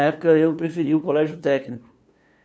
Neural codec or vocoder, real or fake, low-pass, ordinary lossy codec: codec, 16 kHz, 2 kbps, FunCodec, trained on LibriTTS, 25 frames a second; fake; none; none